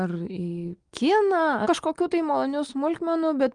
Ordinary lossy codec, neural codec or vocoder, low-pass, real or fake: Opus, 24 kbps; none; 9.9 kHz; real